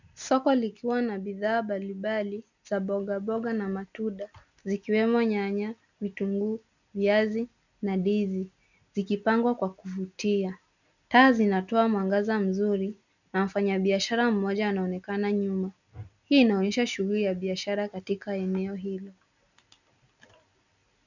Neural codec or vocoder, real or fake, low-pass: none; real; 7.2 kHz